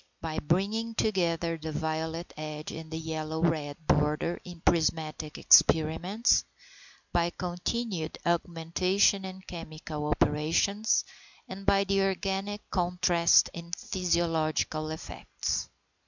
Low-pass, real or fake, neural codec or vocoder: 7.2 kHz; real; none